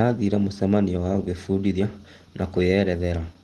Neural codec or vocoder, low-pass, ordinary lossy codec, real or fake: none; 10.8 kHz; Opus, 16 kbps; real